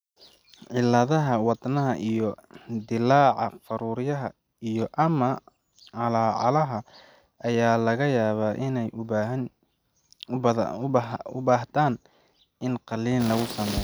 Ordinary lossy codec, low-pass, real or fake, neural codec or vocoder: none; none; real; none